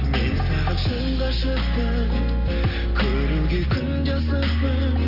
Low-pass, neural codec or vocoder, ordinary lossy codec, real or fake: 5.4 kHz; none; Opus, 32 kbps; real